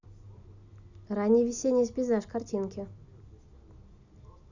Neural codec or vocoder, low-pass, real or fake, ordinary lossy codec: none; 7.2 kHz; real; none